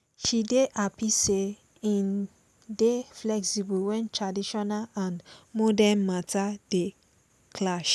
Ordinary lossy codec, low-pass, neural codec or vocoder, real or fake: none; none; none; real